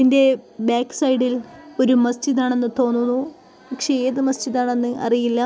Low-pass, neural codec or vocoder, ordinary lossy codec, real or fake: none; none; none; real